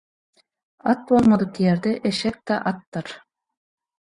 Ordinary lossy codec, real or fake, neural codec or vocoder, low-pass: Opus, 64 kbps; fake; vocoder, 24 kHz, 100 mel bands, Vocos; 10.8 kHz